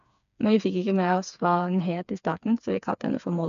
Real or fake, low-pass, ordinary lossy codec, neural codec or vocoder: fake; 7.2 kHz; none; codec, 16 kHz, 4 kbps, FreqCodec, smaller model